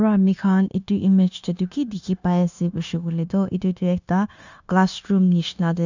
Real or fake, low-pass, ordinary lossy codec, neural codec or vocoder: fake; 7.2 kHz; none; codec, 16 kHz, 0.9 kbps, LongCat-Audio-Codec